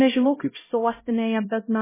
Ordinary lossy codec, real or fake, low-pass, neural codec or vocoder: MP3, 16 kbps; fake; 3.6 kHz; codec, 16 kHz, 0.5 kbps, X-Codec, HuBERT features, trained on LibriSpeech